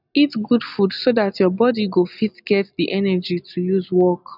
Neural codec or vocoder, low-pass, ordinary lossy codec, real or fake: none; 5.4 kHz; none; real